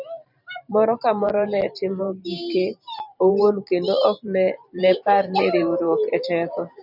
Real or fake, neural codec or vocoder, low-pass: fake; vocoder, 44.1 kHz, 128 mel bands every 512 samples, BigVGAN v2; 5.4 kHz